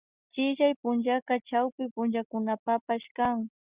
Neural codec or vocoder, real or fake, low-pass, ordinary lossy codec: none; real; 3.6 kHz; Opus, 64 kbps